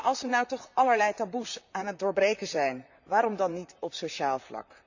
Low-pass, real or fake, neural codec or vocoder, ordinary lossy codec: 7.2 kHz; fake; vocoder, 44.1 kHz, 128 mel bands, Pupu-Vocoder; none